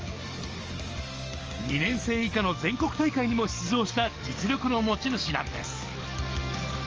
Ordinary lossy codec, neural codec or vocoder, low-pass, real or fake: Opus, 24 kbps; codec, 44.1 kHz, 7.8 kbps, DAC; 7.2 kHz; fake